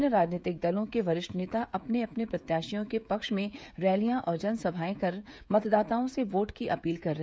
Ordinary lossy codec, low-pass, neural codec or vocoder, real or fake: none; none; codec, 16 kHz, 16 kbps, FreqCodec, smaller model; fake